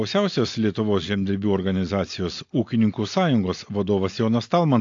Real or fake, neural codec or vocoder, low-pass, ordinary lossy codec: real; none; 7.2 kHz; AAC, 48 kbps